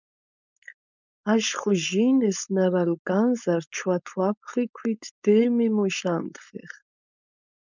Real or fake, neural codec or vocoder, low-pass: fake; codec, 16 kHz, 4.8 kbps, FACodec; 7.2 kHz